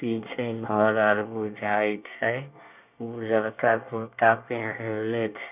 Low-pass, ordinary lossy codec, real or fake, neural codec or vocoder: 3.6 kHz; none; fake; codec, 24 kHz, 1 kbps, SNAC